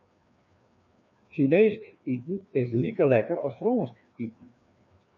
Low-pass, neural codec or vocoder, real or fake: 7.2 kHz; codec, 16 kHz, 2 kbps, FreqCodec, larger model; fake